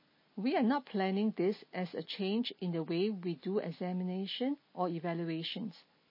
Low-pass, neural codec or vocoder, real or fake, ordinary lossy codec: 5.4 kHz; none; real; MP3, 24 kbps